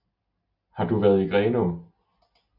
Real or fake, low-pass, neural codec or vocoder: real; 5.4 kHz; none